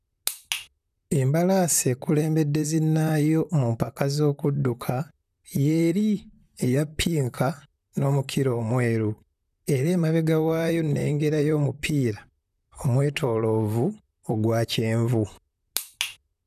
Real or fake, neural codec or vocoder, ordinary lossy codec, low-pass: fake; vocoder, 44.1 kHz, 128 mel bands, Pupu-Vocoder; none; 14.4 kHz